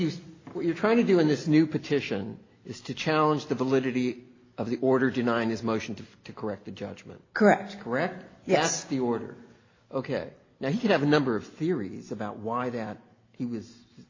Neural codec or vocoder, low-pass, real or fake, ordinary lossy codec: none; 7.2 kHz; real; AAC, 32 kbps